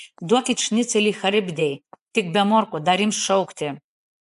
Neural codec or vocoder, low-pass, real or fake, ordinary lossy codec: vocoder, 24 kHz, 100 mel bands, Vocos; 10.8 kHz; fake; AAC, 96 kbps